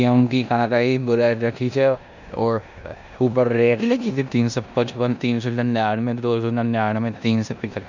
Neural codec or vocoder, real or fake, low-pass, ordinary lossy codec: codec, 16 kHz in and 24 kHz out, 0.9 kbps, LongCat-Audio-Codec, four codebook decoder; fake; 7.2 kHz; none